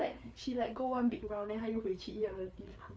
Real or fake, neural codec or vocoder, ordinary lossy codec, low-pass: fake; codec, 16 kHz, 4 kbps, FreqCodec, larger model; none; none